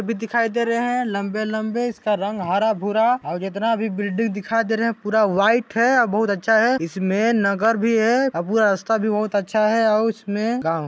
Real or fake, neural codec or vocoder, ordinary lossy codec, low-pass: real; none; none; none